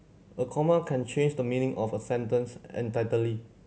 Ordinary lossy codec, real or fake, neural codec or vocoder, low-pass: none; real; none; none